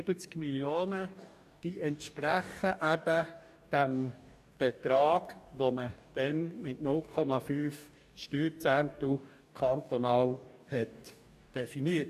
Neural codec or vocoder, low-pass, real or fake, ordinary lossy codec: codec, 44.1 kHz, 2.6 kbps, DAC; 14.4 kHz; fake; none